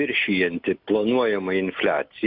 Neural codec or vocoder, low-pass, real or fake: none; 5.4 kHz; real